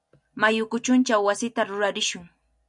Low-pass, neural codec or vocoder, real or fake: 10.8 kHz; none; real